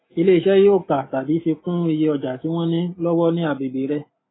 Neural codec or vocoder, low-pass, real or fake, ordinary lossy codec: none; 7.2 kHz; real; AAC, 16 kbps